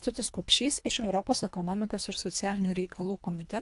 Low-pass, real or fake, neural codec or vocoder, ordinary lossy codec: 10.8 kHz; fake; codec, 24 kHz, 1.5 kbps, HILCodec; AAC, 64 kbps